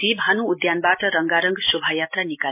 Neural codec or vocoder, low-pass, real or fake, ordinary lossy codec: none; 3.6 kHz; real; MP3, 32 kbps